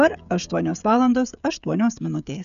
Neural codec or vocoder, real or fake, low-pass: codec, 16 kHz, 16 kbps, FreqCodec, smaller model; fake; 7.2 kHz